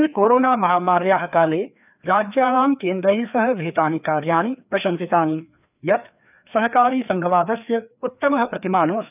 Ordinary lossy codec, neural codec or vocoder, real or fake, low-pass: none; codec, 16 kHz, 2 kbps, FreqCodec, larger model; fake; 3.6 kHz